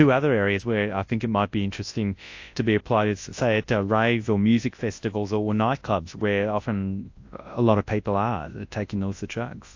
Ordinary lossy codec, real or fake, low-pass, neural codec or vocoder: AAC, 48 kbps; fake; 7.2 kHz; codec, 24 kHz, 0.9 kbps, WavTokenizer, large speech release